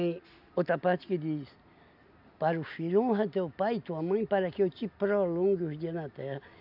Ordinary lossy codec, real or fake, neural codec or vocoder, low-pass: none; real; none; 5.4 kHz